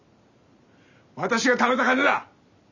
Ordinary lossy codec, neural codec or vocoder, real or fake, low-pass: none; none; real; 7.2 kHz